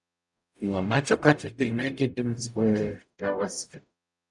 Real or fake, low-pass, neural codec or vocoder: fake; 10.8 kHz; codec, 44.1 kHz, 0.9 kbps, DAC